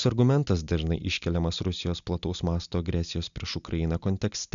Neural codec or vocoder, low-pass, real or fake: none; 7.2 kHz; real